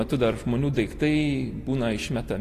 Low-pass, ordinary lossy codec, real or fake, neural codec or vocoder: 14.4 kHz; AAC, 48 kbps; real; none